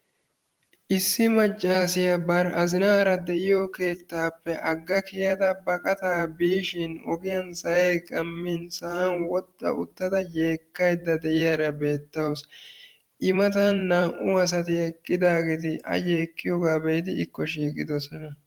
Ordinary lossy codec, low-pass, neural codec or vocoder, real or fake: Opus, 24 kbps; 19.8 kHz; vocoder, 44.1 kHz, 128 mel bands every 512 samples, BigVGAN v2; fake